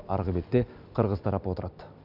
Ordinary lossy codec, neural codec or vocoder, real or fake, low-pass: none; none; real; 5.4 kHz